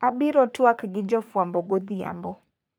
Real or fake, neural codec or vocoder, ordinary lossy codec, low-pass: fake; codec, 44.1 kHz, 3.4 kbps, Pupu-Codec; none; none